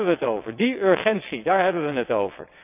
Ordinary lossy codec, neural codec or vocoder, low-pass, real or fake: none; vocoder, 22.05 kHz, 80 mel bands, WaveNeXt; 3.6 kHz; fake